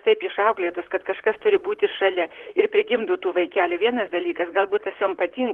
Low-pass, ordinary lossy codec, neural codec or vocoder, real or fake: 19.8 kHz; Opus, 16 kbps; vocoder, 44.1 kHz, 128 mel bands, Pupu-Vocoder; fake